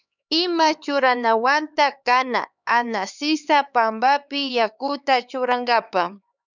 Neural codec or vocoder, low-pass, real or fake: codec, 16 kHz, 4 kbps, X-Codec, HuBERT features, trained on LibriSpeech; 7.2 kHz; fake